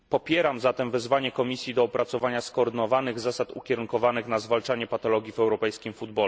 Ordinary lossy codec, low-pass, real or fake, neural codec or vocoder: none; none; real; none